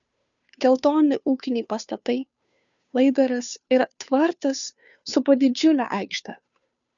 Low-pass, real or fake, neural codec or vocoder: 7.2 kHz; fake; codec, 16 kHz, 2 kbps, FunCodec, trained on Chinese and English, 25 frames a second